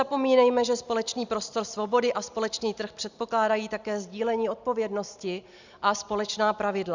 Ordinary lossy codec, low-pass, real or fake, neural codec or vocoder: Opus, 64 kbps; 7.2 kHz; real; none